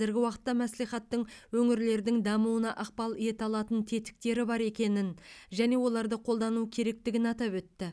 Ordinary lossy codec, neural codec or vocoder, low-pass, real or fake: none; none; none; real